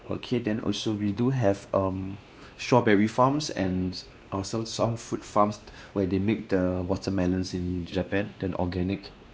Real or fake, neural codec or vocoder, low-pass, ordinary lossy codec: fake; codec, 16 kHz, 2 kbps, X-Codec, WavLM features, trained on Multilingual LibriSpeech; none; none